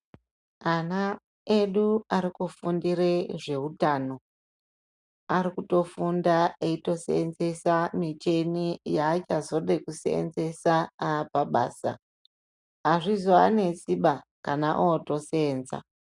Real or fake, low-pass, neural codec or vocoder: real; 10.8 kHz; none